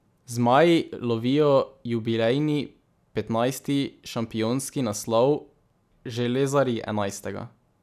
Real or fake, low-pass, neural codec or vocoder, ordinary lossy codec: real; 14.4 kHz; none; none